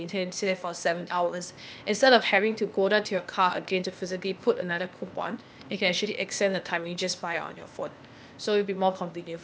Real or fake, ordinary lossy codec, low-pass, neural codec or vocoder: fake; none; none; codec, 16 kHz, 0.8 kbps, ZipCodec